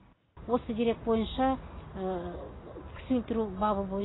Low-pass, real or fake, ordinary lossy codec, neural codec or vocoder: 7.2 kHz; real; AAC, 16 kbps; none